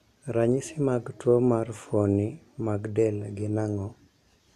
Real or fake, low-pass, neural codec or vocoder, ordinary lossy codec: real; 14.4 kHz; none; none